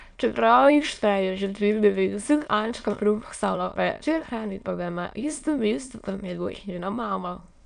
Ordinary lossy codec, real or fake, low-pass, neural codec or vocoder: none; fake; 9.9 kHz; autoencoder, 22.05 kHz, a latent of 192 numbers a frame, VITS, trained on many speakers